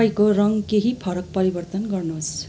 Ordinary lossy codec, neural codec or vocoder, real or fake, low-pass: none; none; real; none